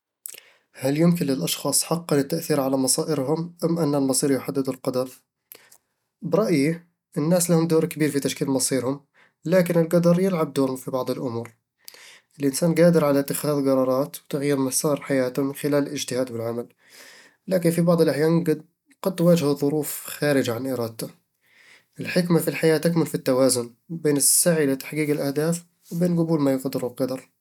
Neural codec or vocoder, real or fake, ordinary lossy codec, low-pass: none; real; none; 19.8 kHz